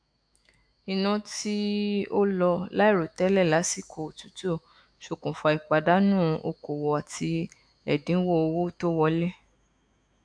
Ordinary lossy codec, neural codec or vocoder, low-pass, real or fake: AAC, 64 kbps; autoencoder, 48 kHz, 128 numbers a frame, DAC-VAE, trained on Japanese speech; 9.9 kHz; fake